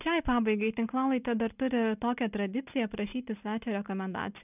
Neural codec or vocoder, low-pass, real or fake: none; 3.6 kHz; real